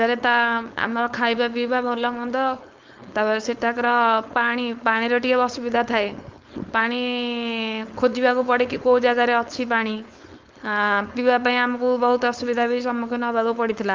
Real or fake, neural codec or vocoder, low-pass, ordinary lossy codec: fake; codec, 16 kHz, 4.8 kbps, FACodec; 7.2 kHz; Opus, 32 kbps